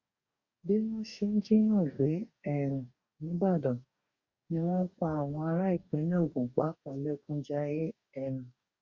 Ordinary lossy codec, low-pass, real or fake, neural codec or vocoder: Opus, 64 kbps; 7.2 kHz; fake; codec, 44.1 kHz, 2.6 kbps, DAC